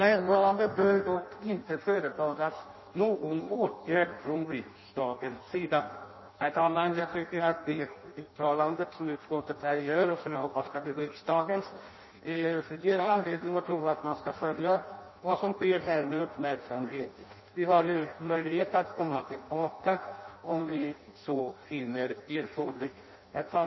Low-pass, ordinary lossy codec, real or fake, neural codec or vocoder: 7.2 kHz; MP3, 24 kbps; fake; codec, 16 kHz in and 24 kHz out, 0.6 kbps, FireRedTTS-2 codec